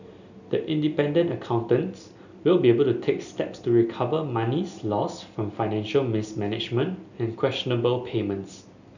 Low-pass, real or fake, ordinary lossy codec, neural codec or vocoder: 7.2 kHz; real; none; none